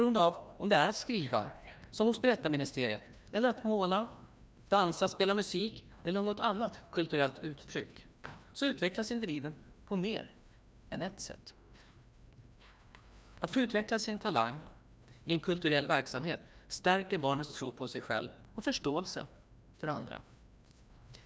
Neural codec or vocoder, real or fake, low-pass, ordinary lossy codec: codec, 16 kHz, 1 kbps, FreqCodec, larger model; fake; none; none